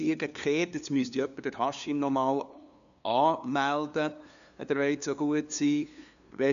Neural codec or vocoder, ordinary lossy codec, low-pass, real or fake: codec, 16 kHz, 2 kbps, FunCodec, trained on LibriTTS, 25 frames a second; none; 7.2 kHz; fake